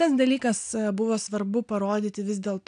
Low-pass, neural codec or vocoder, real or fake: 9.9 kHz; none; real